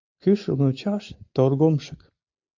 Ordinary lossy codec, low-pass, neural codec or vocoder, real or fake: MP3, 48 kbps; 7.2 kHz; none; real